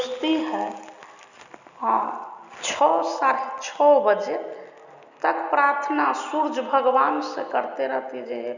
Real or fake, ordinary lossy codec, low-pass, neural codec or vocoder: real; none; 7.2 kHz; none